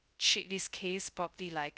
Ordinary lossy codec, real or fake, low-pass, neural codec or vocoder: none; fake; none; codec, 16 kHz, 0.2 kbps, FocalCodec